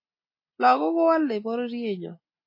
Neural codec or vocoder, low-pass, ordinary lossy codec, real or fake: none; 5.4 kHz; MP3, 24 kbps; real